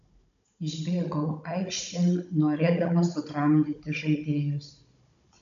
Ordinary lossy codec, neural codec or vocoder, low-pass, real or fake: AAC, 96 kbps; codec, 16 kHz, 16 kbps, FunCodec, trained on Chinese and English, 50 frames a second; 7.2 kHz; fake